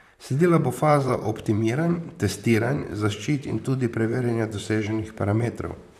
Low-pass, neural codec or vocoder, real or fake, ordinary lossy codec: 14.4 kHz; vocoder, 44.1 kHz, 128 mel bands, Pupu-Vocoder; fake; none